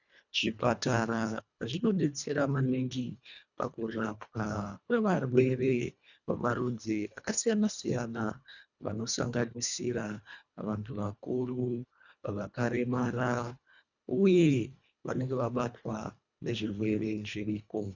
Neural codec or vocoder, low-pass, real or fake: codec, 24 kHz, 1.5 kbps, HILCodec; 7.2 kHz; fake